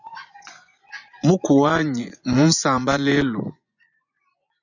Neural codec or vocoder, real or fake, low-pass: vocoder, 24 kHz, 100 mel bands, Vocos; fake; 7.2 kHz